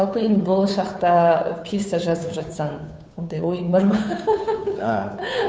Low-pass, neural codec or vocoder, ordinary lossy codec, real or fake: none; codec, 16 kHz, 2 kbps, FunCodec, trained on Chinese and English, 25 frames a second; none; fake